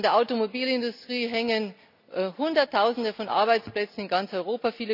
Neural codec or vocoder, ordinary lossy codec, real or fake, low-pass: none; none; real; 5.4 kHz